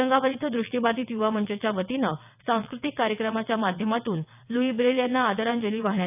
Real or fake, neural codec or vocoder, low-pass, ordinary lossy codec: fake; vocoder, 22.05 kHz, 80 mel bands, WaveNeXt; 3.6 kHz; none